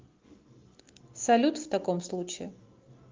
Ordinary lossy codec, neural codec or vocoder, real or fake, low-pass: Opus, 24 kbps; none; real; 7.2 kHz